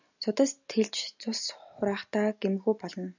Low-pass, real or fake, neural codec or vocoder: 7.2 kHz; real; none